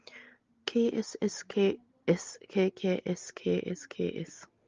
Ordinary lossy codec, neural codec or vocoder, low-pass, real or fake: Opus, 32 kbps; none; 7.2 kHz; real